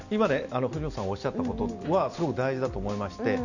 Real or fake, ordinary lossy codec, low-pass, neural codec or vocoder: real; none; 7.2 kHz; none